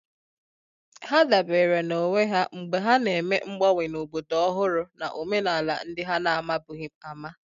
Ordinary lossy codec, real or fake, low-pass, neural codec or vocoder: none; real; 7.2 kHz; none